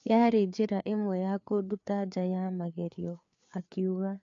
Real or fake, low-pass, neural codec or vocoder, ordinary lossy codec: fake; 7.2 kHz; codec, 16 kHz, 4 kbps, FreqCodec, larger model; AAC, 48 kbps